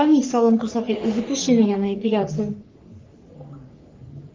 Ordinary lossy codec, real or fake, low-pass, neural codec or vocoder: Opus, 32 kbps; fake; 7.2 kHz; codec, 44.1 kHz, 3.4 kbps, Pupu-Codec